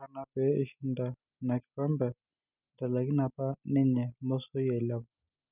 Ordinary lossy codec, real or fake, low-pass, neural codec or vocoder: none; real; 3.6 kHz; none